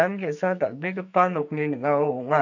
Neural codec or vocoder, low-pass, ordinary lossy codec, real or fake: codec, 44.1 kHz, 2.6 kbps, SNAC; 7.2 kHz; none; fake